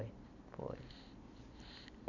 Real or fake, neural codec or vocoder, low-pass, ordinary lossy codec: real; none; 7.2 kHz; none